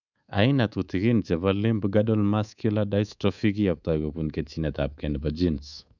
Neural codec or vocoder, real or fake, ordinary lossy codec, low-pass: codec, 24 kHz, 3.1 kbps, DualCodec; fake; none; 7.2 kHz